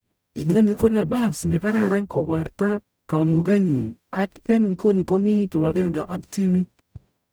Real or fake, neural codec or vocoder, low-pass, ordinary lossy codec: fake; codec, 44.1 kHz, 0.9 kbps, DAC; none; none